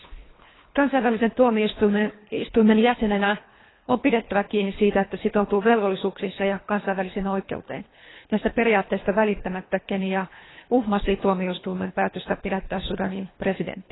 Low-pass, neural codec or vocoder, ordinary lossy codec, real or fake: 7.2 kHz; codec, 24 kHz, 3 kbps, HILCodec; AAC, 16 kbps; fake